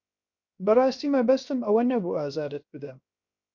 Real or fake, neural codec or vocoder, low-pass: fake; codec, 16 kHz, 0.7 kbps, FocalCodec; 7.2 kHz